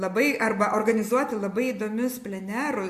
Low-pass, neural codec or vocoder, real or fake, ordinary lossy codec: 14.4 kHz; none; real; MP3, 64 kbps